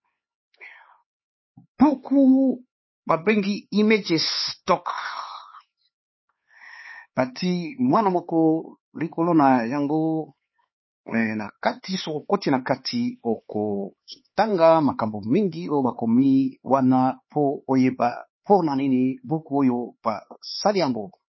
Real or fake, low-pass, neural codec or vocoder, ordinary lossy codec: fake; 7.2 kHz; codec, 16 kHz, 4 kbps, X-Codec, HuBERT features, trained on LibriSpeech; MP3, 24 kbps